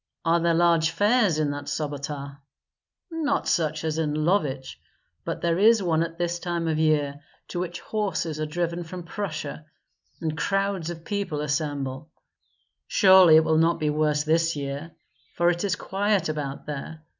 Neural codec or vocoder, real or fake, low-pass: none; real; 7.2 kHz